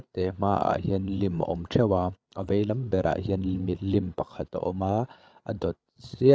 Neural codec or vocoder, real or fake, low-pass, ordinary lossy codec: codec, 16 kHz, 8 kbps, FreqCodec, larger model; fake; none; none